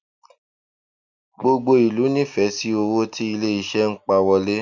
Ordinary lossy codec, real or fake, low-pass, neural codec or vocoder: none; real; 7.2 kHz; none